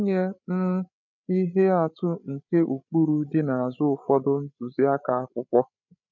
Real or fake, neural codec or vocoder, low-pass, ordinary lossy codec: fake; codec, 16 kHz, 8 kbps, FreqCodec, larger model; 7.2 kHz; none